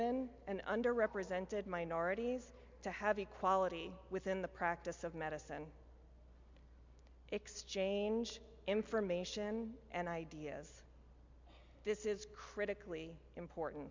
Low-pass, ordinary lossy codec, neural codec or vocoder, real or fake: 7.2 kHz; MP3, 64 kbps; none; real